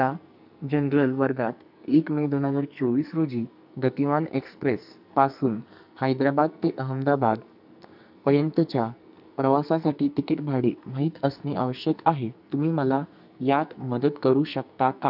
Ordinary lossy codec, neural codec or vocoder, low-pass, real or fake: none; codec, 44.1 kHz, 2.6 kbps, SNAC; 5.4 kHz; fake